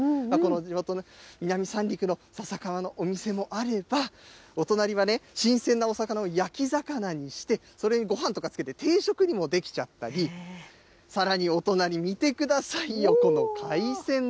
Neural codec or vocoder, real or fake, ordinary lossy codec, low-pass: none; real; none; none